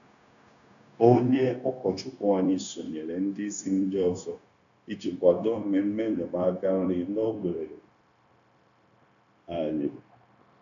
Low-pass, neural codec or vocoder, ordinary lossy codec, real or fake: 7.2 kHz; codec, 16 kHz, 0.9 kbps, LongCat-Audio-Codec; none; fake